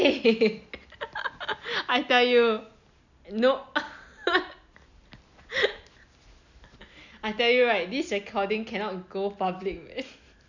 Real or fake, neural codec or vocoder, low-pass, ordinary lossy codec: real; none; 7.2 kHz; none